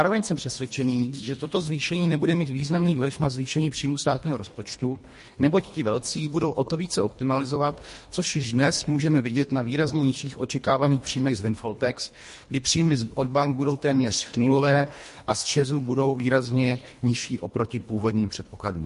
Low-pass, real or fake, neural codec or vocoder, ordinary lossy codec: 10.8 kHz; fake; codec, 24 kHz, 1.5 kbps, HILCodec; MP3, 48 kbps